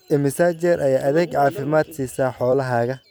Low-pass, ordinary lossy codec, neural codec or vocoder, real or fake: none; none; vocoder, 44.1 kHz, 128 mel bands every 256 samples, BigVGAN v2; fake